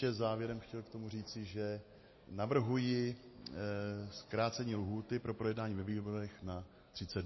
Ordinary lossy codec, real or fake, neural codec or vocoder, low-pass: MP3, 24 kbps; real; none; 7.2 kHz